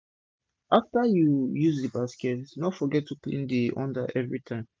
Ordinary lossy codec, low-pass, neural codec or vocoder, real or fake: none; none; none; real